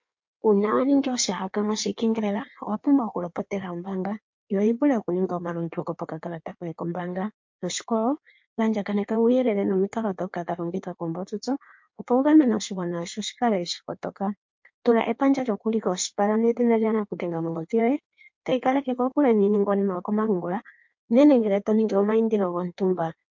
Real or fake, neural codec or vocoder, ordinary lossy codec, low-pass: fake; codec, 16 kHz in and 24 kHz out, 1.1 kbps, FireRedTTS-2 codec; MP3, 48 kbps; 7.2 kHz